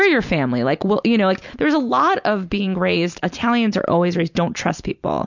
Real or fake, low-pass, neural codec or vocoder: real; 7.2 kHz; none